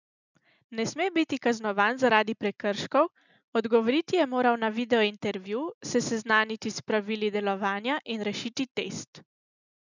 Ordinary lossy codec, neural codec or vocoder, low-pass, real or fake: none; none; 7.2 kHz; real